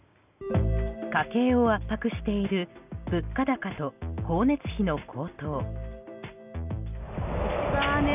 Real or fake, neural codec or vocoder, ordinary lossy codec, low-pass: real; none; none; 3.6 kHz